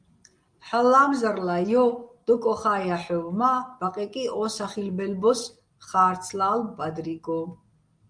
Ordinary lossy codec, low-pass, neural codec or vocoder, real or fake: Opus, 32 kbps; 9.9 kHz; none; real